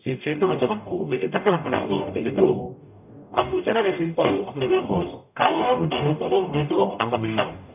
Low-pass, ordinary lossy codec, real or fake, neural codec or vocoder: 3.6 kHz; none; fake; codec, 44.1 kHz, 0.9 kbps, DAC